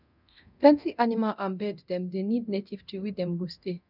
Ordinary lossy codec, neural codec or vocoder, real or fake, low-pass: none; codec, 24 kHz, 0.9 kbps, DualCodec; fake; 5.4 kHz